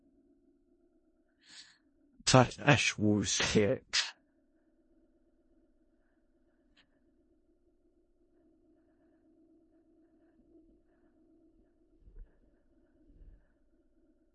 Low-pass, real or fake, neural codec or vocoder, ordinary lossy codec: 10.8 kHz; fake; codec, 16 kHz in and 24 kHz out, 0.4 kbps, LongCat-Audio-Codec, four codebook decoder; MP3, 32 kbps